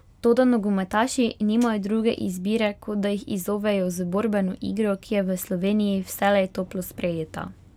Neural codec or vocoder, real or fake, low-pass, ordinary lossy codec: none; real; 19.8 kHz; none